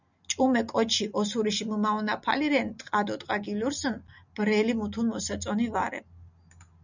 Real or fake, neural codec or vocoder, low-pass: real; none; 7.2 kHz